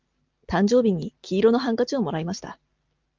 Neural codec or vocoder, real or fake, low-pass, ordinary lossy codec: none; real; 7.2 kHz; Opus, 24 kbps